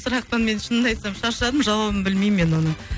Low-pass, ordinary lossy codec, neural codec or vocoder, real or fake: none; none; none; real